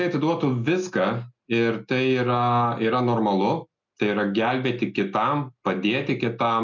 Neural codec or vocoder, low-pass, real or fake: none; 7.2 kHz; real